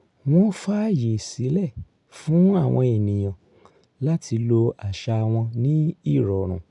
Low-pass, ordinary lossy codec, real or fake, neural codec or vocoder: 10.8 kHz; none; real; none